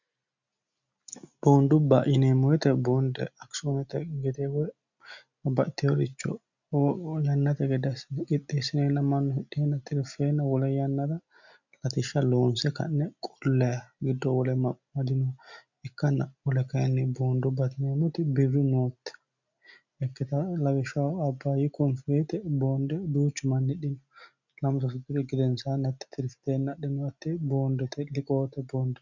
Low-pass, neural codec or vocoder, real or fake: 7.2 kHz; none; real